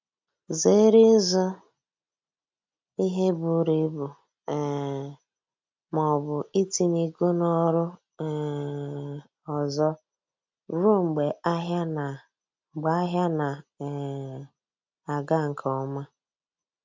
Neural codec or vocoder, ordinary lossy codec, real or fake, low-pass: none; MP3, 64 kbps; real; 7.2 kHz